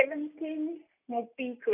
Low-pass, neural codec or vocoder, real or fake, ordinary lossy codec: 3.6 kHz; none; real; none